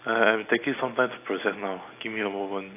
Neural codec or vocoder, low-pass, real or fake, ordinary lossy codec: none; 3.6 kHz; real; AAC, 24 kbps